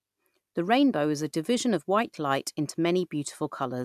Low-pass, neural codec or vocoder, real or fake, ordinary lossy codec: 14.4 kHz; none; real; AAC, 96 kbps